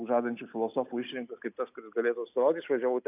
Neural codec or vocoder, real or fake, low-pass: none; real; 3.6 kHz